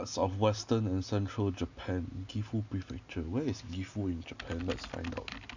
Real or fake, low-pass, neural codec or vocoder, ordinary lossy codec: real; 7.2 kHz; none; none